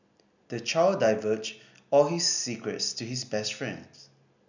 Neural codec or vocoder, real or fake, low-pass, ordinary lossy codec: none; real; 7.2 kHz; none